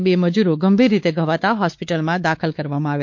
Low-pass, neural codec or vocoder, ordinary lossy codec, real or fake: 7.2 kHz; codec, 16 kHz, 4 kbps, X-Codec, WavLM features, trained on Multilingual LibriSpeech; MP3, 48 kbps; fake